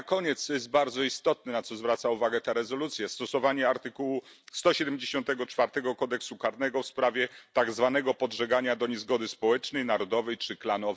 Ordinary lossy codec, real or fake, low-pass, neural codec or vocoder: none; real; none; none